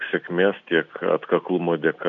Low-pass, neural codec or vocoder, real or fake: 7.2 kHz; none; real